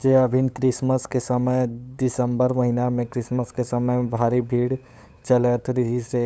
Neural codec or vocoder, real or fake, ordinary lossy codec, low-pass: codec, 16 kHz, 8 kbps, FunCodec, trained on LibriTTS, 25 frames a second; fake; none; none